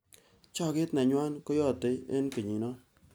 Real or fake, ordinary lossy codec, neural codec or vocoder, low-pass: real; none; none; none